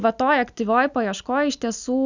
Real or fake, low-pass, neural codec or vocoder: real; 7.2 kHz; none